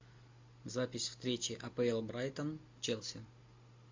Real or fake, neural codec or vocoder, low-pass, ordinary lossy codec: real; none; 7.2 kHz; MP3, 48 kbps